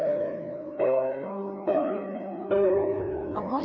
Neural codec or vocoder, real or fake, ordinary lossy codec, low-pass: codec, 16 kHz, 2 kbps, FreqCodec, larger model; fake; none; 7.2 kHz